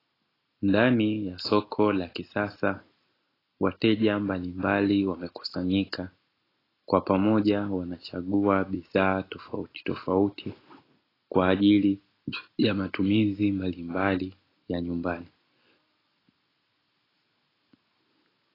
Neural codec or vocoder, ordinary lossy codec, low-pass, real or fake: none; AAC, 24 kbps; 5.4 kHz; real